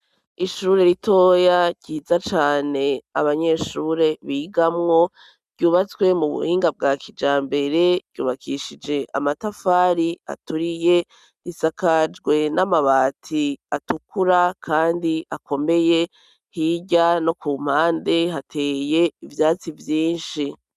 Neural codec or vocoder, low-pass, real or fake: none; 14.4 kHz; real